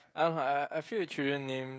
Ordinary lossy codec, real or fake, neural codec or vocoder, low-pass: none; fake; codec, 16 kHz, 16 kbps, FreqCodec, smaller model; none